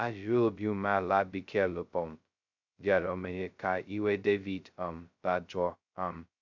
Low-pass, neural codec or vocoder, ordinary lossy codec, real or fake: 7.2 kHz; codec, 16 kHz, 0.2 kbps, FocalCodec; MP3, 64 kbps; fake